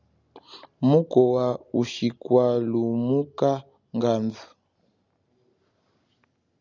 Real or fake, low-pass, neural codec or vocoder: real; 7.2 kHz; none